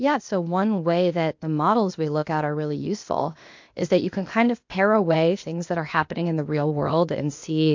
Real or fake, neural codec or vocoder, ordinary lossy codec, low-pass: fake; codec, 16 kHz, 0.8 kbps, ZipCodec; MP3, 48 kbps; 7.2 kHz